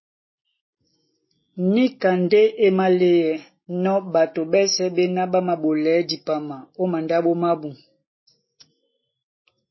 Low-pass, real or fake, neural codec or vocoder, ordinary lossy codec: 7.2 kHz; real; none; MP3, 24 kbps